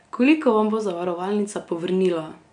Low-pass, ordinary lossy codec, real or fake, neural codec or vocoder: 9.9 kHz; none; real; none